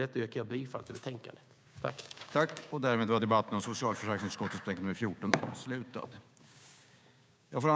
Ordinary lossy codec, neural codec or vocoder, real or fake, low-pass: none; codec, 16 kHz, 6 kbps, DAC; fake; none